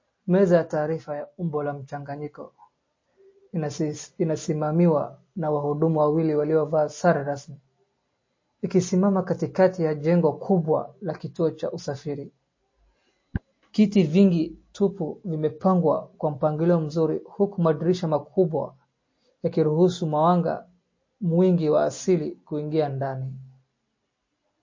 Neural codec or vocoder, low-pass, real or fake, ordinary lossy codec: none; 7.2 kHz; real; MP3, 32 kbps